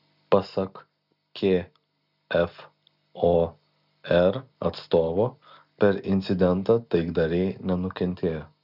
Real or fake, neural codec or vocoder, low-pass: real; none; 5.4 kHz